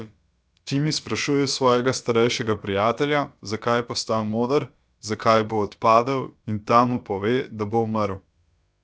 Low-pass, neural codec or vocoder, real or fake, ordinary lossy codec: none; codec, 16 kHz, about 1 kbps, DyCAST, with the encoder's durations; fake; none